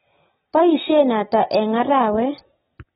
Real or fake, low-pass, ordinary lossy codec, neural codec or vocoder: real; 19.8 kHz; AAC, 16 kbps; none